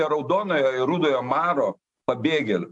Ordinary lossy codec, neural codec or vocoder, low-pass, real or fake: AAC, 64 kbps; none; 10.8 kHz; real